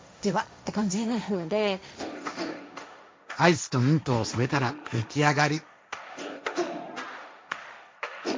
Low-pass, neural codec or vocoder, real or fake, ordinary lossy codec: none; codec, 16 kHz, 1.1 kbps, Voila-Tokenizer; fake; none